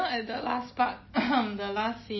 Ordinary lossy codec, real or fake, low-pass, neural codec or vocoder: MP3, 24 kbps; real; 7.2 kHz; none